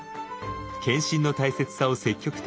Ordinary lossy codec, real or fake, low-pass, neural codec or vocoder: none; real; none; none